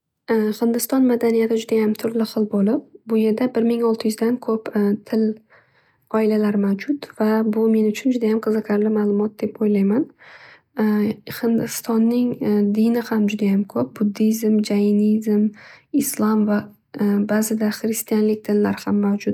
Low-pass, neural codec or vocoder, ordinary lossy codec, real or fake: 19.8 kHz; none; none; real